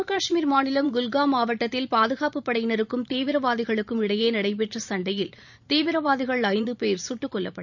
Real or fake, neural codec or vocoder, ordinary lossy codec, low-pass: fake; vocoder, 44.1 kHz, 128 mel bands every 512 samples, BigVGAN v2; none; 7.2 kHz